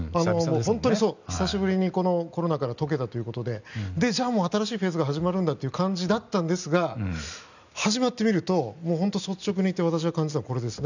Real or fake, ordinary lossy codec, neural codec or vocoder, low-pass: real; none; none; 7.2 kHz